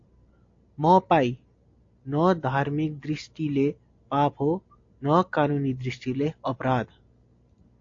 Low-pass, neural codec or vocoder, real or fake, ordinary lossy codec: 7.2 kHz; none; real; AAC, 48 kbps